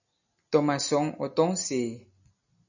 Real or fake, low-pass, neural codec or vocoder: real; 7.2 kHz; none